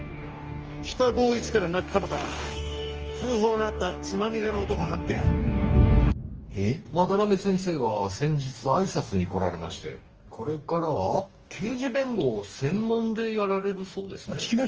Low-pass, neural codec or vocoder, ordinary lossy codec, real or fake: 7.2 kHz; codec, 44.1 kHz, 2.6 kbps, DAC; Opus, 24 kbps; fake